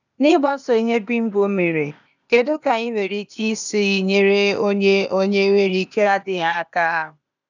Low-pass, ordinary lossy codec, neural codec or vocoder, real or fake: 7.2 kHz; none; codec, 16 kHz, 0.8 kbps, ZipCodec; fake